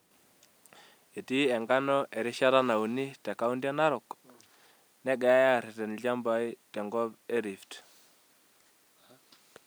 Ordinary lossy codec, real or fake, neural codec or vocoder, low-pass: none; real; none; none